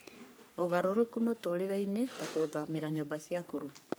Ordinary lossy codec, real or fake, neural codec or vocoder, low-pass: none; fake; codec, 44.1 kHz, 3.4 kbps, Pupu-Codec; none